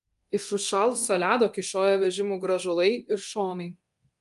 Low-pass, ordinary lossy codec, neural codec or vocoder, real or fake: 10.8 kHz; Opus, 32 kbps; codec, 24 kHz, 0.9 kbps, DualCodec; fake